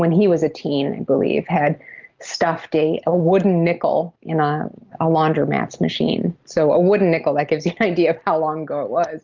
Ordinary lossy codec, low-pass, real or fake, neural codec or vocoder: Opus, 24 kbps; 7.2 kHz; real; none